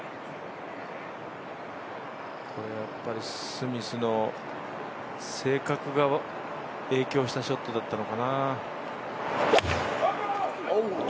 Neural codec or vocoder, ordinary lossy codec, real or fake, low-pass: none; none; real; none